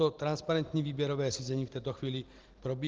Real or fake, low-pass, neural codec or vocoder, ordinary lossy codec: real; 7.2 kHz; none; Opus, 16 kbps